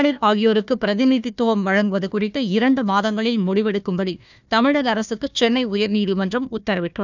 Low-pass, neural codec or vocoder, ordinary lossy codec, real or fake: 7.2 kHz; codec, 16 kHz, 1 kbps, FunCodec, trained on Chinese and English, 50 frames a second; none; fake